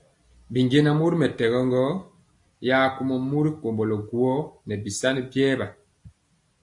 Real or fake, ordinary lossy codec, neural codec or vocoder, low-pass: real; AAC, 64 kbps; none; 10.8 kHz